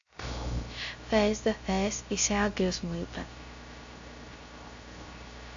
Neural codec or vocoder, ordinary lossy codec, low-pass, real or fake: codec, 16 kHz, 0.3 kbps, FocalCodec; AAC, 64 kbps; 7.2 kHz; fake